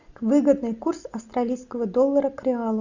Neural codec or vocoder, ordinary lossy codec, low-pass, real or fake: none; Opus, 64 kbps; 7.2 kHz; real